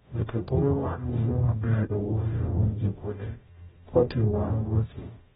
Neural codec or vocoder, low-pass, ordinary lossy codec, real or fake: codec, 44.1 kHz, 0.9 kbps, DAC; 19.8 kHz; AAC, 16 kbps; fake